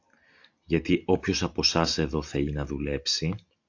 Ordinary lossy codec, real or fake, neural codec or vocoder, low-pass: AAC, 48 kbps; real; none; 7.2 kHz